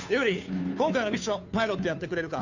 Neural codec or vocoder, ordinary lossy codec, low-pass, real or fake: codec, 16 kHz, 2 kbps, FunCodec, trained on Chinese and English, 25 frames a second; none; 7.2 kHz; fake